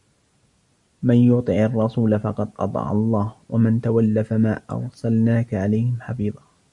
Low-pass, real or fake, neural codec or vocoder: 10.8 kHz; real; none